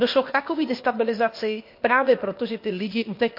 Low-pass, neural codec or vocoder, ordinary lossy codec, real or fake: 5.4 kHz; codec, 16 kHz, 0.8 kbps, ZipCodec; MP3, 32 kbps; fake